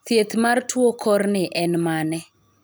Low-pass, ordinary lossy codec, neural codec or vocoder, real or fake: none; none; none; real